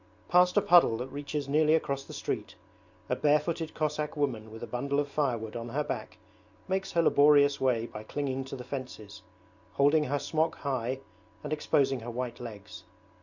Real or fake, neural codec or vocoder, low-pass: real; none; 7.2 kHz